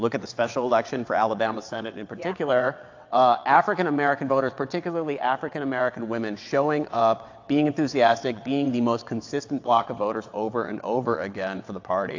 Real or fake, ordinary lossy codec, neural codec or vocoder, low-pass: fake; AAC, 48 kbps; vocoder, 22.05 kHz, 80 mel bands, WaveNeXt; 7.2 kHz